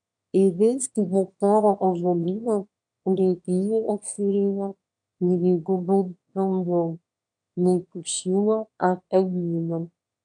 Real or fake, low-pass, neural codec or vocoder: fake; 9.9 kHz; autoencoder, 22.05 kHz, a latent of 192 numbers a frame, VITS, trained on one speaker